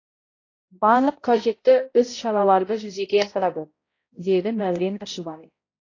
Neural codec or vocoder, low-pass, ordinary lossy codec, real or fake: codec, 16 kHz, 0.5 kbps, X-Codec, HuBERT features, trained on balanced general audio; 7.2 kHz; AAC, 32 kbps; fake